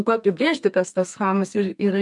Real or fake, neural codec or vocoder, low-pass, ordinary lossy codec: fake; codec, 32 kHz, 1.9 kbps, SNAC; 10.8 kHz; MP3, 64 kbps